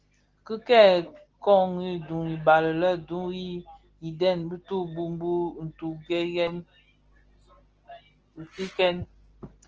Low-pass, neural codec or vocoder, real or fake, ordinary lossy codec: 7.2 kHz; none; real; Opus, 16 kbps